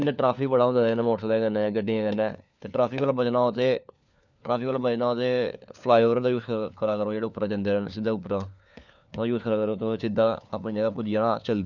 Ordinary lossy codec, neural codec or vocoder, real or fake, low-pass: none; codec, 16 kHz, 4 kbps, FunCodec, trained on LibriTTS, 50 frames a second; fake; 7.2 kHz